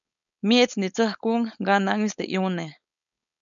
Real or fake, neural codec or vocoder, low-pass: fake; codec, 16 kHz, 4.8 kbps, FACodec; 7.2 kHz